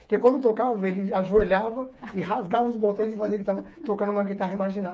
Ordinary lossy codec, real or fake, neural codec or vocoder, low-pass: none; fake; codec, 16 kHz, 4 kbps, FreqCodec, smaller model; none